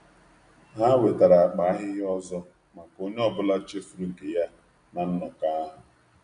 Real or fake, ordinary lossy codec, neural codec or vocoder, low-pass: real; MP3, 64 kbps; none; 9.9 kHz